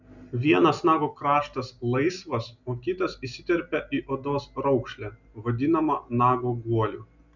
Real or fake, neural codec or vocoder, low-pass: real; none; 7.2 kHz